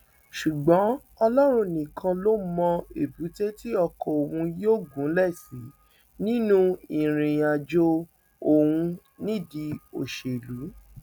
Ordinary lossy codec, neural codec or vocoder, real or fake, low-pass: none; none; real; 19.8 kHz